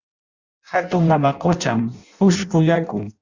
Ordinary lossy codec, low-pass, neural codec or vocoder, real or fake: Opus, 64 kbps; 7.2 kHz; codec, 16 kHz in and 24 kHz out, 0.6 kbps, FireRedTTS-2 codec; fake